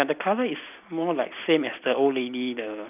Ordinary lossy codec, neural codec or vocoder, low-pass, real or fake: none; none; 3.6 kHz; real